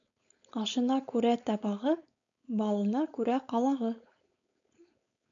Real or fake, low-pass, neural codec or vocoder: fake; 7.2 kHz; codec, 16 kHz, 4.8 kbps, FACodec